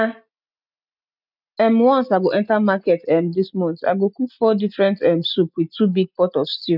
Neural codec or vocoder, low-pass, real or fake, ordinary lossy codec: none; 5.4 kHz; real; none